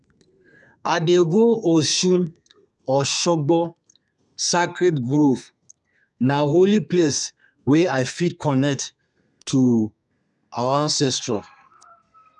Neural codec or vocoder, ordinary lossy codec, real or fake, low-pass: codec, 32 kHz, 1.9 kbps, SNAC; none; fake; 10.8 kHz